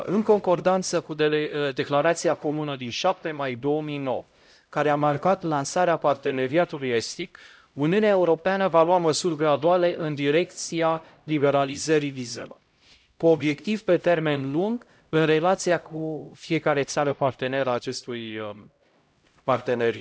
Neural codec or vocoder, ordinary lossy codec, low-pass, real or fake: codec, 16 kHz, 0.5 kbps, X-Codec, HuBERT features, trained on LibriSpeech; none; none; fake